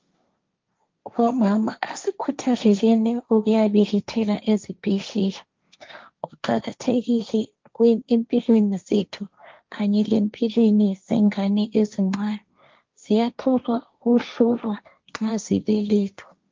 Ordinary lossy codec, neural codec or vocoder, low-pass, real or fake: Opus, 32 kbps; codec, 16 kHz, 1.1 kbps, Voila-Tokenizer; 7.2 kHz; fake